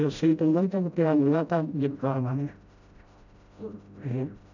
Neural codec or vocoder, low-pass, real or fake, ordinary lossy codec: codec, 16 kHz, 0.5 kbps, FreqCodec, smaller model; 7.2 kHz; fake; none